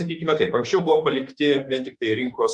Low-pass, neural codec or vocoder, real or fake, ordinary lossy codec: 10.8 kHz; autoencoder, 48 kHz, 32 numbers a frame, DAC-VAE, trained on Japanese speech; fake; Opus, 64 kbps